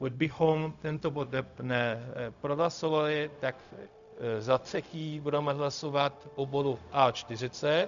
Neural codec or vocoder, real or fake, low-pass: codec, 16 kHz, 0.4 kbps, LongCat-Audio-Codec; fake; 7.2 kHz